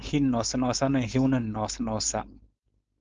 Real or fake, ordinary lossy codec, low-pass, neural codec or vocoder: fake; Opus, 24 kbps; 7.2 kHz; codec, 16 kHz, 4.8 kbps, FACodec